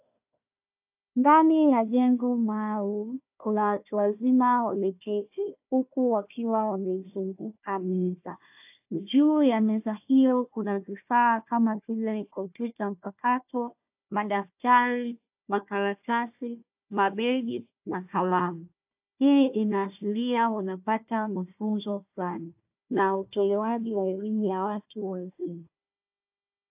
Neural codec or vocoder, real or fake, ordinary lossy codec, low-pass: codec, 16 kHz, 1 kbps, FunCodec, trained on Chinese and English, 50 frames a second; fake; AAC, 32 kbps; 3.6 kHz